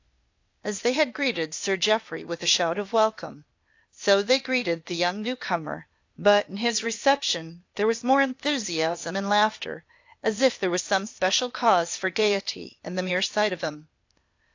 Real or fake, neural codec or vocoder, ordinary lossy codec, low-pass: fake; codec, 16 kHz, 0.8 kbps, ZipCodec; AAC, 48 kbps; 7.2 kHz